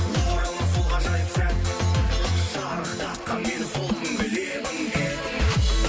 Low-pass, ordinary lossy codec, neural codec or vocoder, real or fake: none; none; none; real